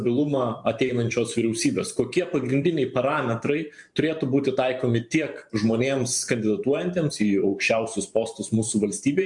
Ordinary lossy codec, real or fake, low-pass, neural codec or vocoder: MP3, 64 kbps; fake; 10.8 kHz; vocoder, 44.1 kHz, 128 mel bands every 512 samples, BigVGAN v2